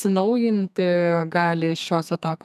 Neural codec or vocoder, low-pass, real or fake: codec, 32 kHz, 1.9 kbps, SNAC; 14.4 kHz; fake